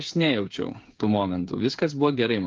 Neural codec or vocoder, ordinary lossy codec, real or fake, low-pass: codec, 16 kHz, 8 kbps, FreqCodec, smaller model; Opus, 24 kbps; fake; 7.2 kHz